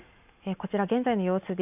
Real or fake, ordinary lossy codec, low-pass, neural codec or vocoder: real; AAC, 32 kbps; 3.6 kHz; none